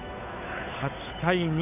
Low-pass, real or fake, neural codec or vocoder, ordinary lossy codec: 3.6 kHz; fake; codec, 16 kHz, 8 kbps, FunCodec, trained on Chinese and English, 25 frames a second; none